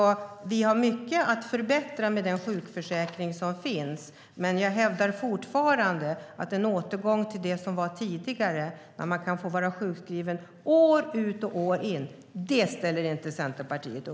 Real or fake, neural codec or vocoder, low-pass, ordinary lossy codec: real; none; none; none